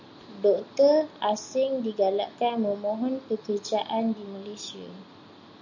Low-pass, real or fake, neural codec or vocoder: 7.2 kHz; real; none